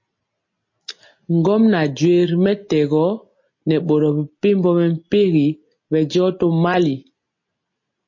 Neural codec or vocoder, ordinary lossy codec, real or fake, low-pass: none; MP3, 32 kbps; real; 7.2 kHz